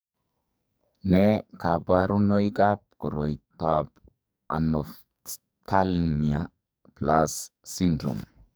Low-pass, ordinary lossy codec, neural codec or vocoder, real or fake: none; none; codec, 44.1 kHz, 2.6 kbps, SNAC; fake